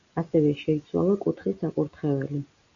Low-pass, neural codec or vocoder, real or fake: 7.2 kHz; none; real